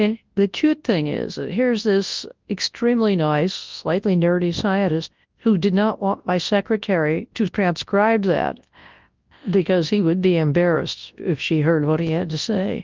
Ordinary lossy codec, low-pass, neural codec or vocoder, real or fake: Opus, 32 kbps; 7.2 kHz; codec, 24 kHz, 0.9 kbps, WavTokenizer, large speech release; fake